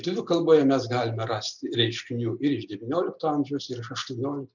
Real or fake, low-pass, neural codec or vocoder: real; 7.2 kHz; none